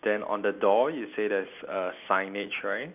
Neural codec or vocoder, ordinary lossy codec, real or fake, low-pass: none; none; real; 3.6 kHz